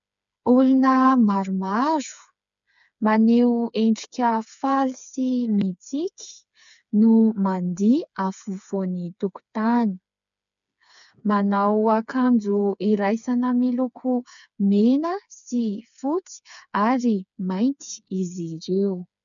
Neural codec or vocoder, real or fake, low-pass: codec, 16 kHz, 4 kbps, FreqCodec, smaller model; fake; 7.2 kHz